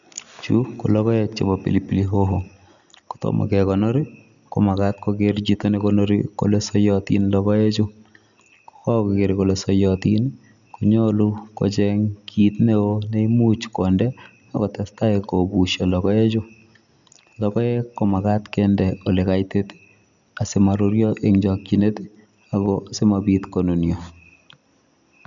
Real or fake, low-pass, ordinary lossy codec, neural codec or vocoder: real; 7.2 kHz; none; none